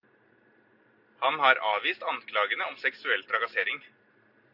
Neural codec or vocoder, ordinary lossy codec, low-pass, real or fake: none; Opus, 64 kbps; 5.4 kHz; real